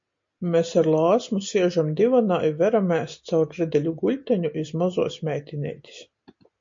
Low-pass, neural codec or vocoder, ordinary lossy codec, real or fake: 7.2 kHz; none; MP3, 48 kbps; real